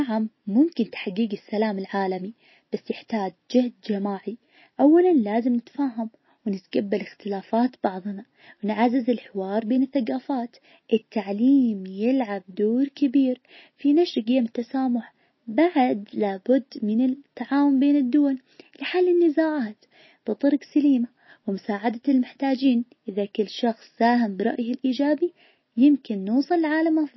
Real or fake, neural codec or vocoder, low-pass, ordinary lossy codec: real; none; 7.2 kHz; MP3, 24 kbps